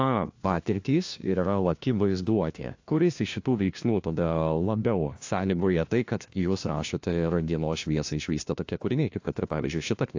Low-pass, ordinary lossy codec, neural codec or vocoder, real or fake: 7.2 kHz; AAC, 48 kbps; codec, 16 kHz, 1 kbps, FunCodec, trained on LibriTTS, 50 frames a second; fake